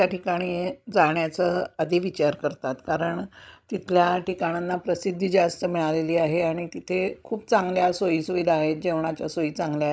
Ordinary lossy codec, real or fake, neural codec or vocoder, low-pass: none; fake; codec, 16 kHz, 16 kbps, FreqCodec, larger model; none